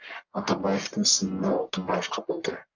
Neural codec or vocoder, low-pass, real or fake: codec, 44.1 kHz, 1.7 kbps, Pupu-Codec; 7.2 kHz; fake